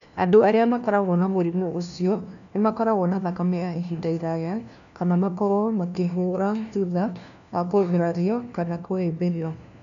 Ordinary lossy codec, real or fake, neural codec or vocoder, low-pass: none; fake; codec, 16 kHz, 1 kbps, FunCodec, trained on LibriTTS, 50 frames a second; 7.2 kHz